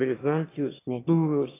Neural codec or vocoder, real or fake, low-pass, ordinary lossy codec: autoencoder, 22.05 kHz, a latent of 192 numbers a frame, VITS, trained on one speaker; fake; 3.6 kHz; AAC, 16 kbps